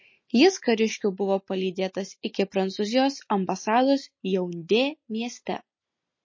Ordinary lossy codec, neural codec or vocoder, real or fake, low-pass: MP3, 32 kbps; none; real; 7.2 kHz